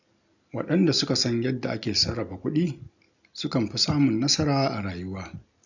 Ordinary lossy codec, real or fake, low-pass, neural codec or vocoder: none; real; 7.2 kHz; none